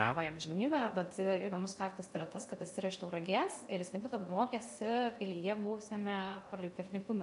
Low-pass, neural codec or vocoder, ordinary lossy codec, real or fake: 10.8 kHz; codec, 16 kHz in and 24 kHz out, 0.6 kbps, FocalCodec, streaming, 2048 codes; MP3, 96 kbps; fake